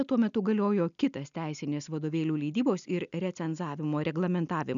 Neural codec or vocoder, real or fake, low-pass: none; real; 7.2 kHz